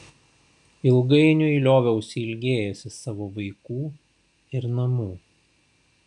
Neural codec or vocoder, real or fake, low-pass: none; real; 10.8 kHz